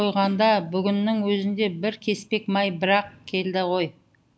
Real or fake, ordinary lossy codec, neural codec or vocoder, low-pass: real; none; none; none